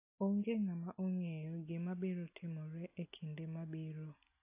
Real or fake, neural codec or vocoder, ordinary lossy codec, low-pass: real; none; AAC, 24 kbps; 3.6 kHz